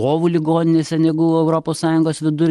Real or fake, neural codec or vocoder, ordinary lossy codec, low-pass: real; none; Opus, 24 kbps; 10.8 kHz